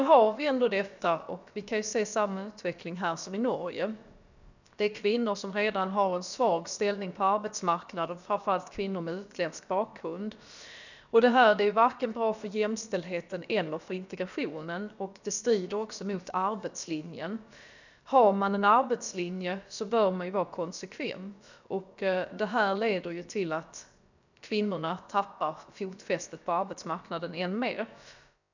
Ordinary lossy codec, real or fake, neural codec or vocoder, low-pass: none; fake; codec, 16 kHz, about 1 kbps, DyCAST, with the encoder's durations; 7.2 kHz